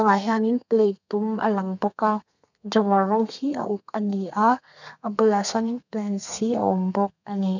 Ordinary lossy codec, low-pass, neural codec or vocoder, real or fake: none; 7.2 kHz; codec, 32 kHz, 1.9 kbps, SNAC; fake